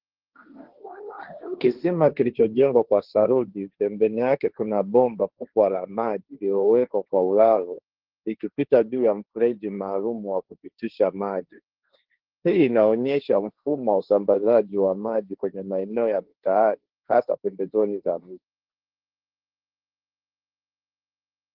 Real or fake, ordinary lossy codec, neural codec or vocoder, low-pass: fake; Opus, 16 kbps; codec, 16 kHz, 1.1 kbps, Voila-Tokenizer; 5.4 kHz